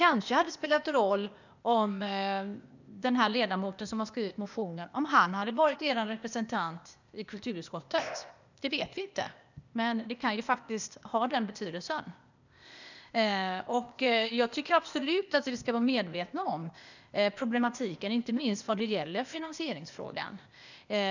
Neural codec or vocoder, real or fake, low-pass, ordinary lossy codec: codec, 16 kHz, 0.8 kbps, ZipCodec; fake; 7.2 kHz; none